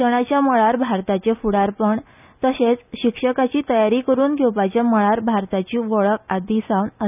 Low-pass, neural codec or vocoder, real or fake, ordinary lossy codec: 3.6 kHz; none; real; none